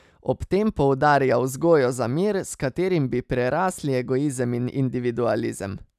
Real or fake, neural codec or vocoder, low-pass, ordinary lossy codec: real; none; 14.4 kHz; none